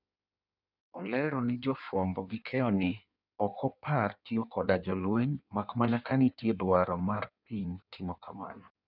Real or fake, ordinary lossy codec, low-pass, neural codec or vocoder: fake; none; 5.4 kHz; codec, 16 kHz in and 24 kHz out, 1.1 kbps, FireRedTTS-2 codec